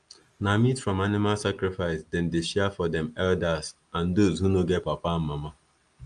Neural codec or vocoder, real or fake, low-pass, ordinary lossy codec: none; real; 9.9 kHz; Opus, 32 kbps